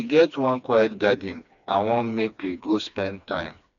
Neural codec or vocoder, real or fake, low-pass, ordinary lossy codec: codec, 16 kHz, 2 kbps, FreqCodec, smaller model; fake; 7.2 kHz; none